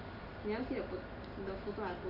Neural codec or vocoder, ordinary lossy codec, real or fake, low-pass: none; none; real; 5.4 kHz